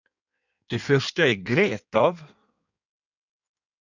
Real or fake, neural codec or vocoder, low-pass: fake; codec, 16 kHz in and 24 kHz out, 1.1 kbps, FireRedTTS-2 codec; 7.2 kHz